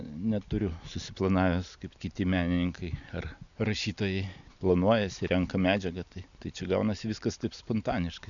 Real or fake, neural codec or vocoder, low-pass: real; none; 7.2 kHz